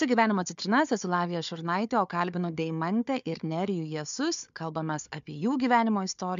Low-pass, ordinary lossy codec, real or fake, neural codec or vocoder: 7.2 kHz; MP3, 64 kbps; fake; codec, 16 kHz, 4 kbps, FunCodec, trained on Chinese and English, 50 frames a second